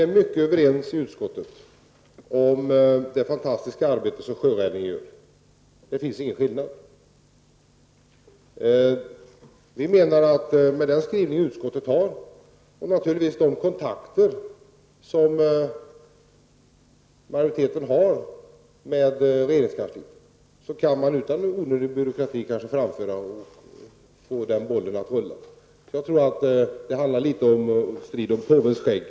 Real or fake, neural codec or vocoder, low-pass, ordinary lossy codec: real; none; none; none